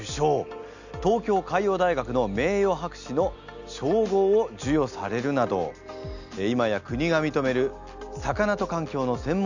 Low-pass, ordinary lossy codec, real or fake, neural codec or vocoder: 7.2 kHz; none; real; none